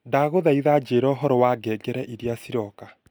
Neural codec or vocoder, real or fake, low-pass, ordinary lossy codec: none; real; none; none